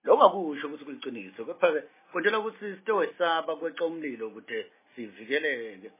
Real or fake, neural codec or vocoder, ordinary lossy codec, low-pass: real; none; MP3, 16 kbps; 3.6 kHz